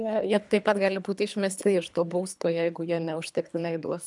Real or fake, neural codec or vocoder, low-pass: fake; codec, 24 kHz, 3 kbps, HILCodec; 10.8 kHz